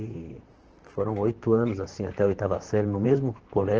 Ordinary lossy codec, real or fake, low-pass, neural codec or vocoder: Opus, 16 kbps; fake; 7.2 kHz; vocoder, 44.1 kHz, 128 mel bands, Pupu-Vocoder